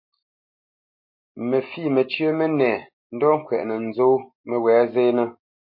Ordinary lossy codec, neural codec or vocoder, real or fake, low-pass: MP3, 32 kbps; none; real; 5.4 kHz